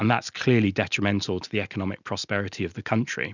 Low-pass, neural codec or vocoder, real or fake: 7.2 kHz; none; real